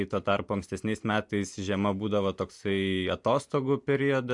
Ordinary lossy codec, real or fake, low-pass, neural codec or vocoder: MP3, 64 kbps; real; 10.8 kHz; none